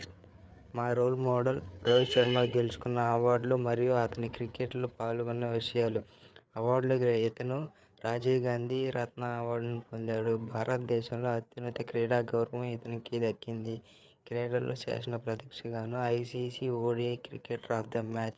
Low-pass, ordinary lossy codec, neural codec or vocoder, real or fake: none; none; codec, 16 kHz, 4 kbps, FreqCodec, larger model; fake